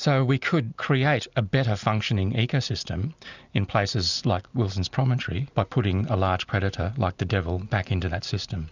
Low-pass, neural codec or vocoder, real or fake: 7.2 kHz; none; real